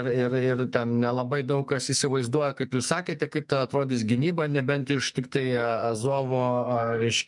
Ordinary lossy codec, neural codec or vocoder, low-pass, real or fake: MP3, 96 kbps; codec, 44.1 kHz, 2.6 kbps, SNAC; 10.8 kHz; fake